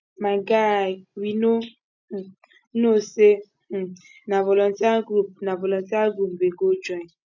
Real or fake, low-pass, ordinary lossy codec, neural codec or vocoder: real; 7.2 kHz; none; none